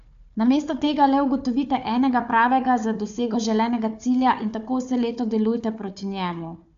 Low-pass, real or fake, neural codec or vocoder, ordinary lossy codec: 7.2 kHz; fake; codec, 16 kHz, 4 kbps, FunCodec, trained on Chinese and English, 50 frames a second; AAC, 64 kbps